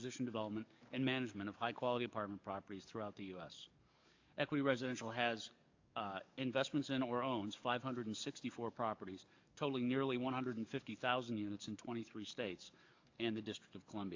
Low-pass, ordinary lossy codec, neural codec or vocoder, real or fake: 7.2 kHz; AAC, 48 kbps; codec, 44.1 kHz, 7.8 kbps, DAC; fake